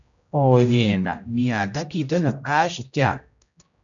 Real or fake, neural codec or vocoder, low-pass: fake; codec, 16 kHz, 0.5 kbps, X-Codec, HuBERT features, trained on general audio; 7.2 kHz